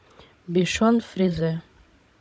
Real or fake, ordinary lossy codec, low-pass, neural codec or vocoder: fake; none; none; codec, 16 kHz, 16 kbps, FunCodec, trained on Chinese and English, 50 frames a second